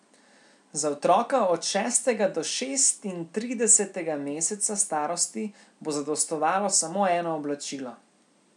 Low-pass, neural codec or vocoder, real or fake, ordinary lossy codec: 10.8 kHz; none; real; none